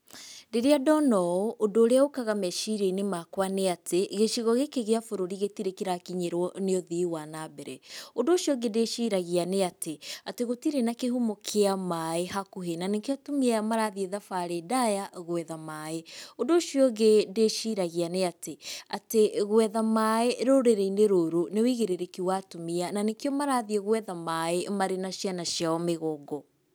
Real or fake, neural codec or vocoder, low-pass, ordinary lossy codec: real; none; none; none